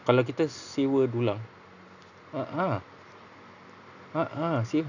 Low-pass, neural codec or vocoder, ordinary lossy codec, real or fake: 7.2 kHz; none; none; real